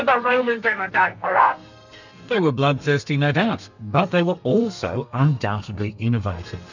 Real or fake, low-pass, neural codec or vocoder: fake; 7.2 kHz; codec, 32 kHz, 1.9 kbps, SNAC